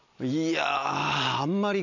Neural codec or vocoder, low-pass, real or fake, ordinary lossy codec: none; 7.2 kHz; real; none